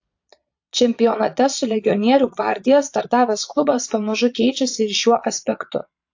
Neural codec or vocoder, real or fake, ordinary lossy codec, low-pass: vocoder, 22.05 kHz, 80 mel bands, Vocos; fake; AAC, 48 kbps; 7.2 kHz